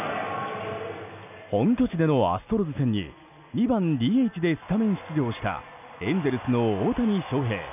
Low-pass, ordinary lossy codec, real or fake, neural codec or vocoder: 3.6 kHz; none; real; none